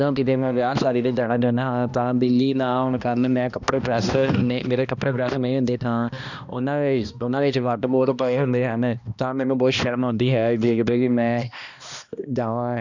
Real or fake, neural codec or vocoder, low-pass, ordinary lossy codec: fake; codec, 16 kHz, 1 kbps, X-Codec, HuBERT features, trained on balanced general audio; 7.2 kHz; none